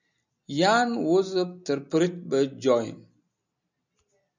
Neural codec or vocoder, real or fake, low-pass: none; real; 7.2 kHz